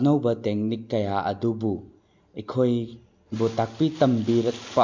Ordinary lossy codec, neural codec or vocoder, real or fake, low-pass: MP3, 64 kbps; none; real; 7.2 kHz